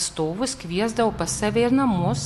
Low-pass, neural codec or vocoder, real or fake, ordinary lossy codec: 14.4 kHz; none; real; MP3, 64 kbps